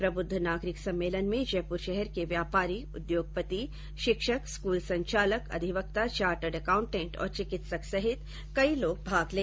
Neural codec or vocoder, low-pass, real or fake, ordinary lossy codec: none; none; real; none